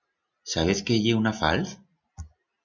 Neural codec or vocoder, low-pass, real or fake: none; 7.2 kHz; real